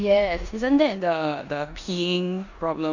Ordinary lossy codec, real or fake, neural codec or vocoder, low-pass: none; fake; codec, 16 kHz in and 24 kHz out, 0.9 kbps, LongCat-Audio-Codec, four codebook decoder; 7.2 kHz